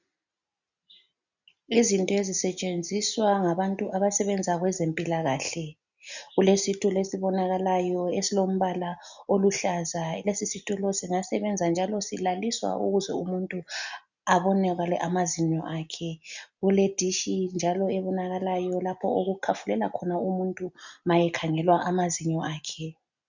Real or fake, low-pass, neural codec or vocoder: real; 7.2 kHz; none